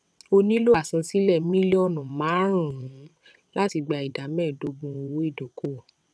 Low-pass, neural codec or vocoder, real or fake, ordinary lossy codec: none; vocoder, 22.05 kHz, 80 mel bands, WaveNeXt; fake; none